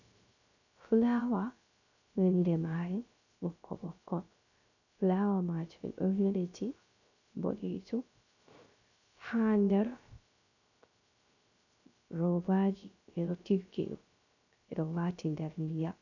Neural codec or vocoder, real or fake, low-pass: codec, 16 kHz, 0.3 kbps, FocalCodec; fake; 7.2 kHz